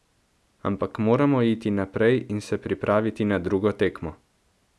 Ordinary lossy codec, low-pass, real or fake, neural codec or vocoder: none; none; real; none